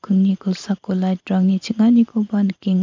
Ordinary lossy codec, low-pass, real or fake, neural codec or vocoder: MP3, 64 kbps; 7.2 kHz; fake; vocoder, 44.1 kHz, 128 mel bands every 512 samples, BigVGAN v2